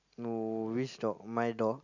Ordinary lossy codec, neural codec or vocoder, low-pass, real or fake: none; none; 7.2 kHz; real